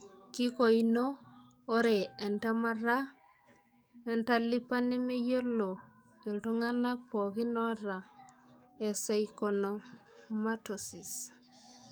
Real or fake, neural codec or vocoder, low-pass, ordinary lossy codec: fake; codec, 44.1 kHz, 7.8 kbps, DAC; none; none